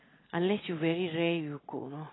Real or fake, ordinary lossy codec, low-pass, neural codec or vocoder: real; AAC, 16 kbps; 7.2 kHz; none